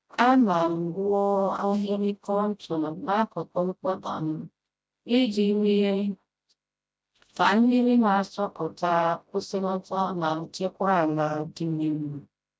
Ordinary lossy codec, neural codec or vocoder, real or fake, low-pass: none; codec, 16 kHz, 0.5 kbps, FreqCodec, smaller model; fake; none